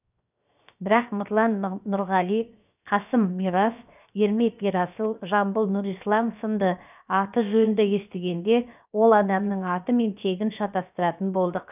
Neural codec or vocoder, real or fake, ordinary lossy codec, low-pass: codec, 16 kHz, 0.7 kbps, FocalCodec; fake; none; 3.6 kHz